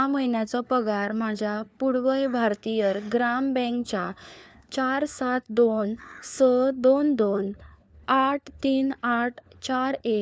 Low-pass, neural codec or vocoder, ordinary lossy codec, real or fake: none; codec, 16 kHz, 4 kbps, FunCodec, trained on LibriTTS, 50 frames a second; none; fake